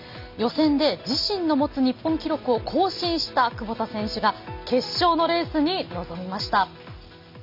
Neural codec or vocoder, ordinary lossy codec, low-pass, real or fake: none; MP3, 48 kbps; 5.4 kHz; real